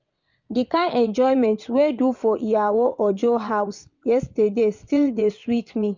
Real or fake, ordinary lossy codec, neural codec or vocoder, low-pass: fake; MP3, 64 kbps; vocoder, 22.05 kHz, 80 mel bands, WaveNeXt; 7.2 kHz